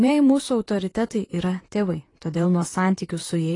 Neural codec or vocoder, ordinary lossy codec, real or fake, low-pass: vocoder, 44.1 kHz, 128 mel bands, Pupu-Vocoder; AAC, 32 kbps; fake; 10.8 kHz